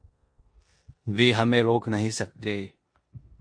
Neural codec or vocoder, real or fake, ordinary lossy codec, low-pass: codec, 16 kHz in and 24 kHz out, 0.9 kbps, LongCat-Audio-Codec, four codebook decoder; fake; MP3, 48 kbps; 9.9 kHz